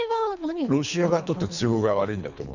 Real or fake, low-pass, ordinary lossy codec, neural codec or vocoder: fake; 7.2 kHz; none; codec, 24 kHz, 3 kbps, HILCodec